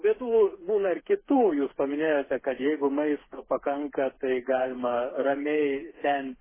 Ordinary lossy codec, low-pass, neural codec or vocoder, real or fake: MP3, 16 kbps; 3.6 kHz; codec, 16 kHz, 4 kbps, FreqCodec, smaller model; fake